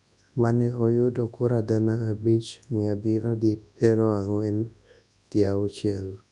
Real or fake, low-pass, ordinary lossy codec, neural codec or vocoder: fake; 10.8 kHz; none; codec, 24 kHz, 0.9 kbps, WavTokenizer, large speech release